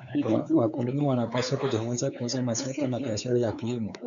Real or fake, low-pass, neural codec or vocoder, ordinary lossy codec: fake; 7.2 kHz; codec, 16 kHz, 4 kbps, X-Codec, WavLM features, trained on Multilingual LibriSpeech; MP3, 96 kbps